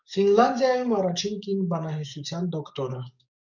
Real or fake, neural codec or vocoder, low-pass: fake; codec, 44.1 kHz, 7.8 kbps, DAC; 7.2 kHz